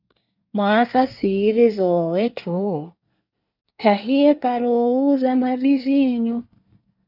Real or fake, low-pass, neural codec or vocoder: fake; 5.4 kHz; codec, 24 kHz, 1 kbps, SNAC